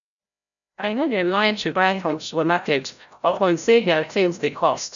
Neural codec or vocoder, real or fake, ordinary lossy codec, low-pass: codec, 16 kHz, 0.5 kbps, FreqCodec, larger model; fake; none; 7.2 kHz